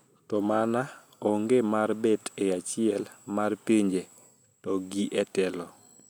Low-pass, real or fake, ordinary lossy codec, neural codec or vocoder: none; real; none; none